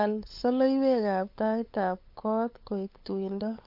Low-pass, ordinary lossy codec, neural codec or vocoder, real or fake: 5.4 kHz; MP3, 32 kbps; codec, 16 kHz, 8 kbps, FunCodec, trained on LibriTTS, 25 frames a second; fake